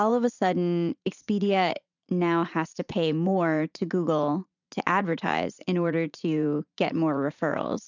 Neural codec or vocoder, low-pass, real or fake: vocoder, 44.1 kHz, 80 mel bands, Vocos; 7.2 kHz; fake